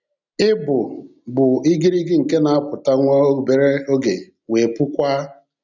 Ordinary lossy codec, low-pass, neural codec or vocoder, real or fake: none; 7.2 kHz; none; real